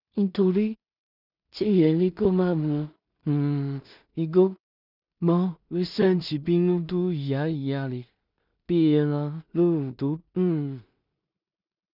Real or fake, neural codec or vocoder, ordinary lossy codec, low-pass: fake; codec, 16 kHz in and 24 kHz out, 0.4 kbps, LongCat-Audio-Codec, two codebook decoder; none; 5.4 kHz